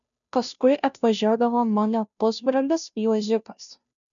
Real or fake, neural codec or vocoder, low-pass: fake; codec, 16 kHz, 0.5 kbps, FunCodec, trained on Chinese and English, 25 frames a second; 7.2 kHz